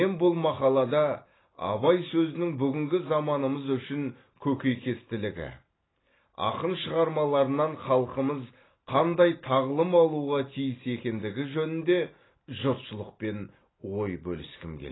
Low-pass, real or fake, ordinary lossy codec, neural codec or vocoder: 7.2 kHz; real; AAC, 16 kbps; none